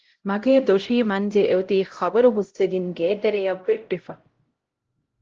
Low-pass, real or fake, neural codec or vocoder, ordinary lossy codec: 7.2 kHz; fake; codec, 16 kHz, 0.5 kbps, X-Codec, HuBERT features, trained on LibriSpeech; Opus, 16 kbps